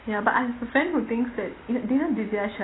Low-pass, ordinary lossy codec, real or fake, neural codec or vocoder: 7.2 kHz; AAC, 16 kbps; real; none